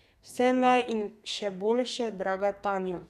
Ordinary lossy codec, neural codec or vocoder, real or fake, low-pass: none; codec, 32 kHz, 1.9 kbps, SNAC; fake; 14.4 kHz